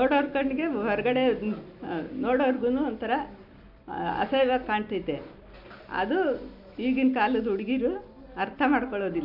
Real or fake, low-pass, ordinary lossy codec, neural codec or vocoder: real; 5.4 kHz; none; none